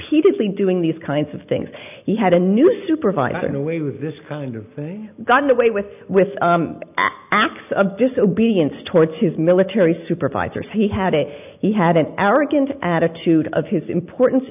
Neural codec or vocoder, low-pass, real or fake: none; 3.6 kHz; real